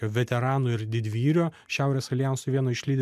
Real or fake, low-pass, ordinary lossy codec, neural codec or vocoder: real; 14.4 kHz; MP3, 96 kbps; none